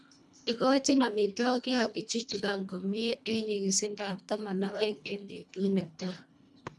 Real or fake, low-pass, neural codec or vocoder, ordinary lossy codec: fake; none; codec, 24 kHz, 1.5 kbps, HILCodec; none